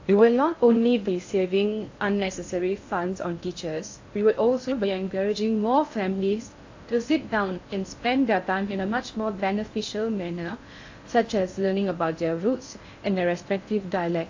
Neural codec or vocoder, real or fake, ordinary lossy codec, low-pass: codec, 16 kHz in and 24 kHz out, 0.6 kbps, FocalCodec, streaming, 2048 codes; fake; AAC, 48 kbps; 7.2 kHz